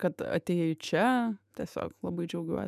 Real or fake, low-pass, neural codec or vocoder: fake; 14.4 kHz; autoencoder, 48 kHz, 128 numbers a frame, DAC-VAE, trained on Japanese speech